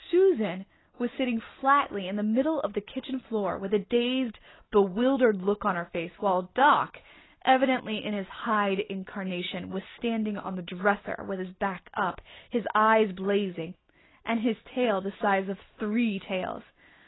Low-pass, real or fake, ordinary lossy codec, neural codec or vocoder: 7.2 kHz; real; AAC, 16 kbps; none